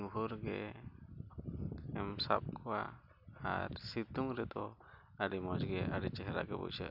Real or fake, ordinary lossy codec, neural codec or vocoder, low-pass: real; none; none; 5.4 kHz